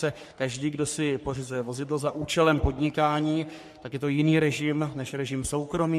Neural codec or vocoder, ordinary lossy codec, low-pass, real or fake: codec, 44.1 kHz, 3.4 kbps, Pupu-Codec; MP3, 64 kbps; 14.4 kHz; fake